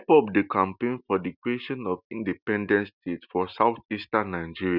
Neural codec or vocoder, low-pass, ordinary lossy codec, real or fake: none; 5.4 kHz; none; real